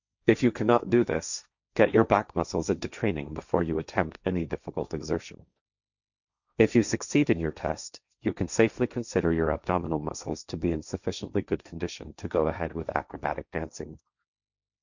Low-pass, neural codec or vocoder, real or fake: 7.2 kHz; codec, 16 kHz, 1.1 kbps, Voila-Tokenizer; fake